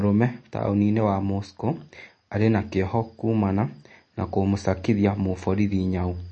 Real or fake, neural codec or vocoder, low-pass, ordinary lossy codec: real; none; 10.8 kHz; MP3, 32 kbps